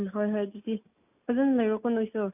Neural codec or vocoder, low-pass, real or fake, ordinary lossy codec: none; 3.6 kHz; real; none